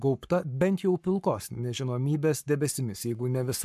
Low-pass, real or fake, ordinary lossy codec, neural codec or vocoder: 14.4 kHz; fake; MP3, 96 kbps; codec, 44.1 kHz, 7.8 kbps, Pupu-Codec